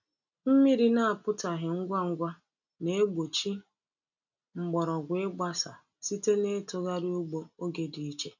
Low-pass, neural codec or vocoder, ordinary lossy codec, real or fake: 7.2 kHz; none; none; real